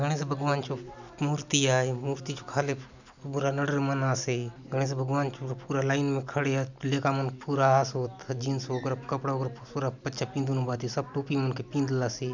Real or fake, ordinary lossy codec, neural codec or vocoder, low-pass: real; none; none; 7.2 kHz